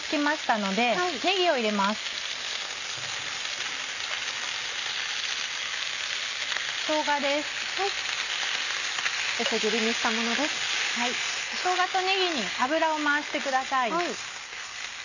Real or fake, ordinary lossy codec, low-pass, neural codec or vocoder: real; none; 7.2 kHz; none